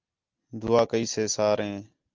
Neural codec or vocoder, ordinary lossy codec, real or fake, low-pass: none; Opus, 32 kbps; real; 7.2 kHz